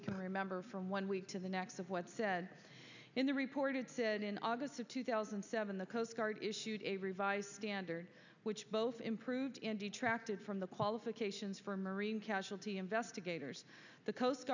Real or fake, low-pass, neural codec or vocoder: real; 7.2 kHz; none